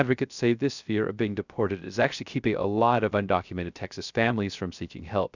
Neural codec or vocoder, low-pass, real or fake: codec, 16 kHz, 0.3 kbps, FocalCodec; 7.2 kHz; fake